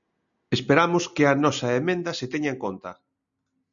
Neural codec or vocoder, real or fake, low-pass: none; real; 7.2 kHz